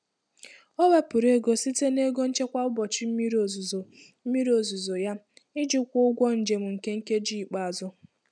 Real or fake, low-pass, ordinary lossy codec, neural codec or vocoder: real; 9.9 kHz; none; none